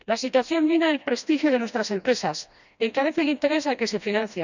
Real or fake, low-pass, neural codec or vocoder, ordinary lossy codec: fake; 7.2 kHz; codec, 16 kHz, 1 kbps, FreqCodec, smaller model; none